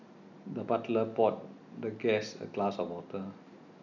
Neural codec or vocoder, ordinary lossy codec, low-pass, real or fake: none; none; 7.2 kHz; real